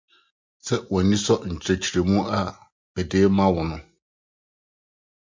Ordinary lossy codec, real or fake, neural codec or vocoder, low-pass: MP3, 48 kbps; real; none; 7.2 kHz